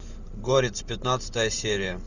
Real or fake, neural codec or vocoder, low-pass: fake; vocoder, 44.1 kHz, 128 mel bands every 512 samples, BigVGAN v2; 7.2 kHz